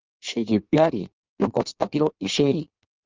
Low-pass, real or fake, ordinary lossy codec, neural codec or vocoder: 7.2 kHz; fake; Opus, 24 kbps; codec, 16 kHz in and 24 kHz out, 1.1 kbps, FireRedTTS-2 codec